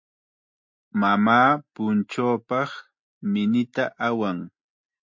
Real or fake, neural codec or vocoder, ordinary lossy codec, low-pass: real; none; MP3, 48 kbps; 7.2 kHz